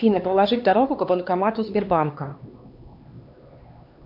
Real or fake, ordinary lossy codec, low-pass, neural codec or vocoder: fake; AAC, 48 kbps; 5.4 kHz; codec, 16 kHz, 2 kbps, X-Codec, HuBERT features, trained on LibriSpeech